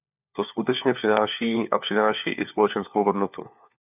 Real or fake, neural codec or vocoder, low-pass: fake; codec, 16 kHz, 4 kbps, FunCodec, trained on LibriTTS, 50 frames a second; 3.6 kHz